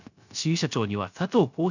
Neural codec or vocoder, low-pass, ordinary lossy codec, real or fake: codec, 16 kHz, 0.3 kbps, FocalCodec; 7.2 kHz; none; fake